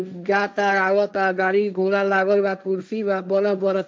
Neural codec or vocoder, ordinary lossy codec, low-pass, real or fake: codec, 16 kHz, 1.1 kbps, Voila-Tokenizer; none; none; fake